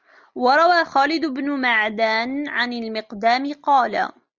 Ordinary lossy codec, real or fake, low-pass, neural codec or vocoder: Opus, 32 kbps; real; 7.2 kHz; none